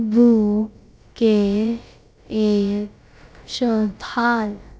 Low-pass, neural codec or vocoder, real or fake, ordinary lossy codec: none; codec, 16 kHz, about 1 kbps, DyCAST, with the encoder's durations; fake; none